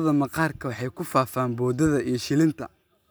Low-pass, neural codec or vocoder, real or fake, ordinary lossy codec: none; none; real; none